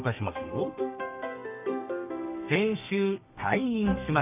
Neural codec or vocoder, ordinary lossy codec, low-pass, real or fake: codec, 32 kHz, 1.9 kbps, SNAC; none; 3.6 kHz; fake